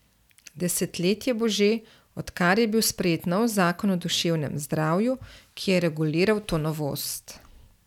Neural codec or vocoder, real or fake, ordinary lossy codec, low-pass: none; real; none; 19.8 kHz